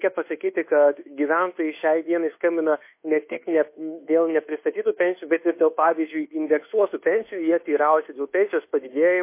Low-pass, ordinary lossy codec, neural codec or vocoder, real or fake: 3.6 kHz; MP3, 24 kbps; codec, 24 kHz, 1.2 kbps, DualCodec; fake